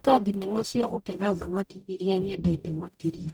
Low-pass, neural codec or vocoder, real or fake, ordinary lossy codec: none; codec, 44.1 kHz, 0.9 kbps, DAC; fake; none